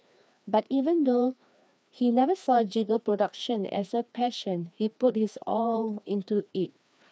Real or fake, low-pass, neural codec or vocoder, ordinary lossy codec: fake; none; codec, 16 kHz, 2 kbps, FreqCodec, larger model; none